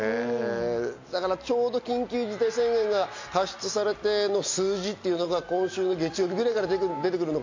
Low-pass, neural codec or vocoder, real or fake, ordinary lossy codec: 7.2 kHz; none; real; MP3, 48 kbps